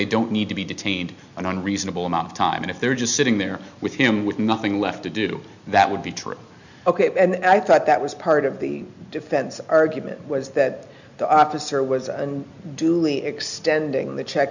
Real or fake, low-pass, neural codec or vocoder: real; 7.2 kHz; none